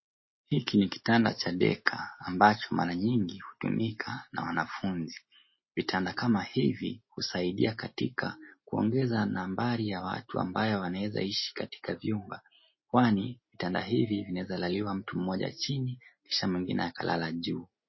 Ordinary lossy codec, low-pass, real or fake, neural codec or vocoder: MP3, 24 kbps; 7.2 kHz; real; none